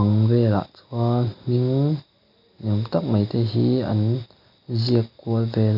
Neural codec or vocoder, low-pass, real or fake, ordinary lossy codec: none; 5.4 kHz; real; none